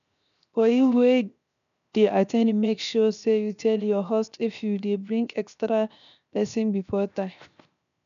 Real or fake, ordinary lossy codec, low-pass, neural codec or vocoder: fake; none; 7.2 kHz; codec, 16 kHz, 0.7 kbps, FocalCodec